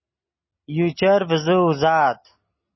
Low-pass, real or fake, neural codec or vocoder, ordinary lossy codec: 7.2 kHz; real; none; MP3, 24 kbps